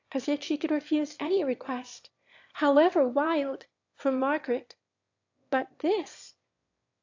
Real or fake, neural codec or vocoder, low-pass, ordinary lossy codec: fake; autoencoder, 22.05 kHz, a latent of 192 numbers a frame, VITS, trained on one speaker; 7.2 kHz; AAC, 48 kbps